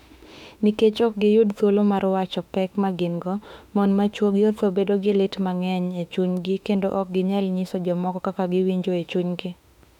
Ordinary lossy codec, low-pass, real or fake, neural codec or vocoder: none; 19.8 kHz; fake; autoencoder, 48 kHz, 32 numbers a frame, DAC-VAE, trained on Japanese speech